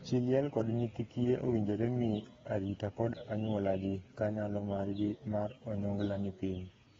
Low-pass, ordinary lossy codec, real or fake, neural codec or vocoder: 7.2 kHz; AAC, 24 kbps; fake; codec, 16 kHz, 8 kbps, FreqCodec, smaller model